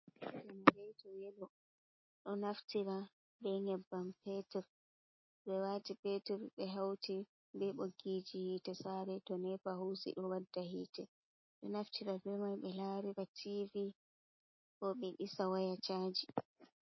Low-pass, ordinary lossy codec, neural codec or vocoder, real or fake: 7.2 kHz; MP3, 24 kbps; none; real